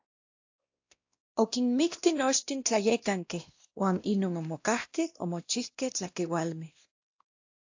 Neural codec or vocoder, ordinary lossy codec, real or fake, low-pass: codec, 16 kHz, 1 kbps, X-Codec, WavLM features, trained on Multilingual LibriSpeech; AAC, 48 kbps; fake; 7.2 kHz